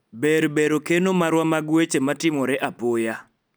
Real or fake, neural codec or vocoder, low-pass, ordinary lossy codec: real; none; none; none